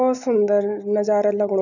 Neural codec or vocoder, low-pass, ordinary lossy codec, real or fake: none; 7.2 kHz; none; real